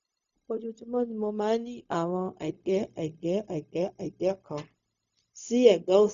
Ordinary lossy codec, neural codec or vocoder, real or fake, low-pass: none; codec, 16 kHz, 0.4 kbps, LongCat-Audio-Codec; fake; 7.2 kHz